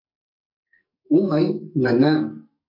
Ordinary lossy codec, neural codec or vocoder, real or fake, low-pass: MP3, 48 kbps; codec, 44.1 kHz, 2.6 kbps, SNAC; fake; 5.4 kHz